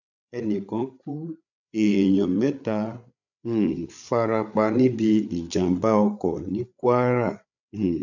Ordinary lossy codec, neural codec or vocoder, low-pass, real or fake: none; codec, 16 kHz, 8 kbps, FreqCodec, larger model; 7.2 kHz; fake